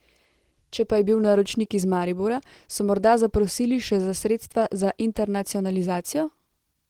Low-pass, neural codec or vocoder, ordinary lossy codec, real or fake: 19.8 kHz; none; Opus, 16 kbps; real